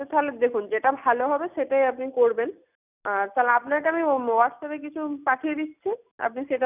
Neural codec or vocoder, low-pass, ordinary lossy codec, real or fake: none; 3.6 kHz; none; real